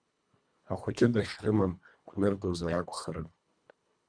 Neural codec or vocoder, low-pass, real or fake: codec, 24 kHz, 1.5 kbps, HILCodec; 9.9 kHz; fake